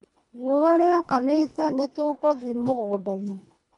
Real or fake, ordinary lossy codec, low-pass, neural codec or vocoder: fake; none; 10.8 kHz; codec, 24 kHz, 1.5 kbps, HILCodec